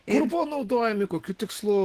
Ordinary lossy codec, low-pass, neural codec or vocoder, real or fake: Opus, 16 kbps; 14.4 kHz; autoencoder, 48 kHz, 128 numbers a frame, DAC-VAE, trained on Japanese speech; fake